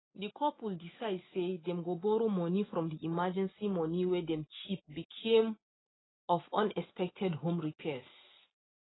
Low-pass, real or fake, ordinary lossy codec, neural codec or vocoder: 7.2 kHz; real; AAC, 16 kbps; none